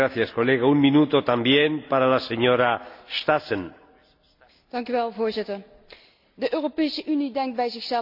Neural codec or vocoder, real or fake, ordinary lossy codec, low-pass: none; real; AAC, 48 kbps; 5.4 kHz